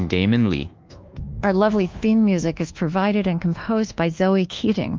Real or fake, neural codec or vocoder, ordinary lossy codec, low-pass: fake; codec, 24 kHz, 1.2 kbps, DualCodec; Opus, 32 kbps; 7.2 kHz